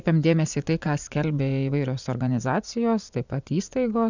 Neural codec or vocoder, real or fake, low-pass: vocoder, 24 kHz, 100 mel bands, Vocos; fake; 7.2 kHz